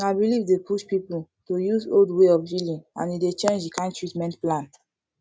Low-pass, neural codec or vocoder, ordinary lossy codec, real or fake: none; none; none; real